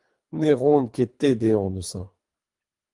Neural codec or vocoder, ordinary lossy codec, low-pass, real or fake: codec, 24 kHz, 3 kbps, HILCodec; Opus, 32 kbps; 10.8 kHz; fake